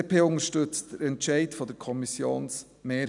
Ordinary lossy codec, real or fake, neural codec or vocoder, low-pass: none; real; none; 10.8 kHz